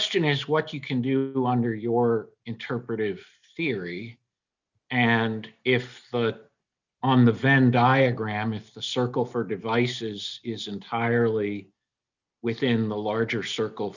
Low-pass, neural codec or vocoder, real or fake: 7.2 kHz; none; real